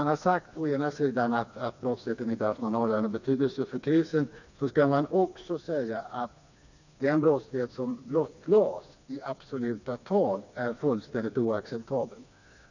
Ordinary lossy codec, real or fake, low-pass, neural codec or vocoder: none; fake; 7.2 kHz; codec, 16 kHz, 2 kbps, FreqCodec, smaller model